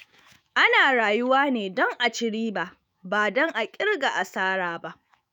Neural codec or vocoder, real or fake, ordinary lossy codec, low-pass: autoencoder, 48 kHz, 128 numbers a frame, DAC-VAE, trained on Japanese speech; fake; none; none